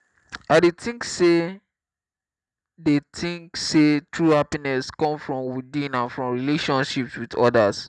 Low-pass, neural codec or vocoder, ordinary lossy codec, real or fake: 10.8 kHz; none; none; real